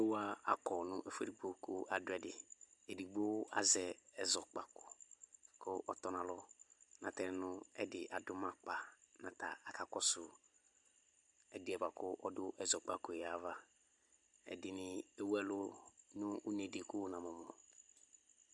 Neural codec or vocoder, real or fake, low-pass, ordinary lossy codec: none; real; 10.8 kHz; Opus, 64 kbps